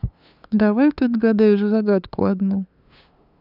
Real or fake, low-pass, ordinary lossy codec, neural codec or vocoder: fake; 5.4 kHz; none; codec, 16 kHz, 2 kbps, FunCodec, trained on LibriTTS, 25 frames a second